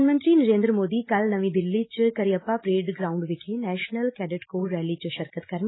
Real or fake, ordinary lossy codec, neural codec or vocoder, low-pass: real; AAC, 16 kbps; none; 7.2 kHz